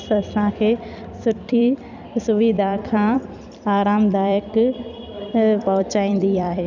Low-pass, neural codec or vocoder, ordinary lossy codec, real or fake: 7.2 kHz; vocoder, 44.1 kHz, 128 mel bands every 256 samples, BigVGAN v2; none; fake